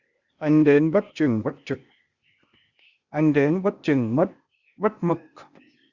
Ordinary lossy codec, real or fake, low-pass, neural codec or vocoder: Opus, 64 kbps; fake; 7.2 kHz; codec, 16 kHz, 0.8 kbps, ZipCodec